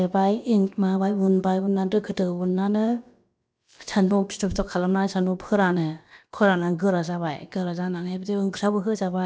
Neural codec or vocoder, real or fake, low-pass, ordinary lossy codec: codec, 16 kHz, about 1 kbps, DyCAST, with the encoder's durations; fake; none; none